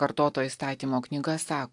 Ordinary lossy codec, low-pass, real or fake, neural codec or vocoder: AAC, 64 kbps; 10.8 kHz; real; none